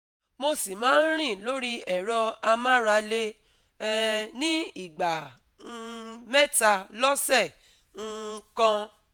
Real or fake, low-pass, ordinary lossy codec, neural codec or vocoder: fake; none; none; vocoder, 48 kHz, 128 mel bands, Vocos